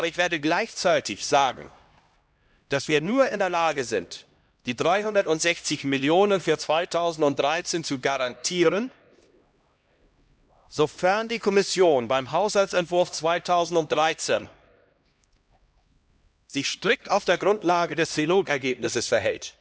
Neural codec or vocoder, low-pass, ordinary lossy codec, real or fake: codec, 16 kHz, 1 kbps, X-Codec, HuBERT features, trained on LibriSpeech; none; none; fake